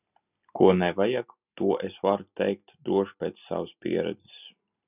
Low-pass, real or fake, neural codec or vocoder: 3.6 kHz; real; none